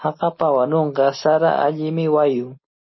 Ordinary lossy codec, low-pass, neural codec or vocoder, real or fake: MP3, 24 kbps; 7.2 kHz; none; real